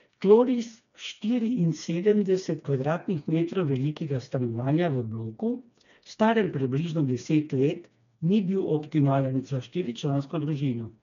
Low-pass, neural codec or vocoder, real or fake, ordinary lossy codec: 7.2 kHz; codec, 16 kHz, 2 kbps, FreqCodec, smaller model; fake; none